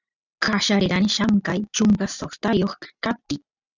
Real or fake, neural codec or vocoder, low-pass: real; none; 7.2 kHz